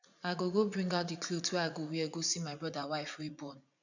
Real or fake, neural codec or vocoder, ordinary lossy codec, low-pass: fake; vocoder, 44.1 kHz, 80 mel bands, Vocos; none; 7.2 kHz